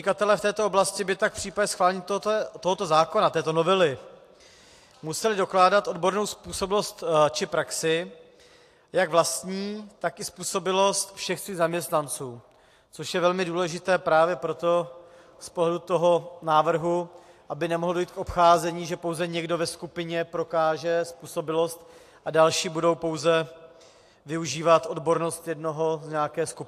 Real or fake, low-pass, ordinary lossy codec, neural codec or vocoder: real; 14.4 kHz; AAC, 64 kbps; none